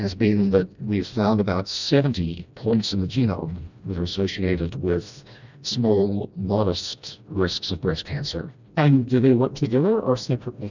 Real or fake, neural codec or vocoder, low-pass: fake; codec, 16 kHz, 1 kbps, FreqCodec, smaller model; 7.2 kHz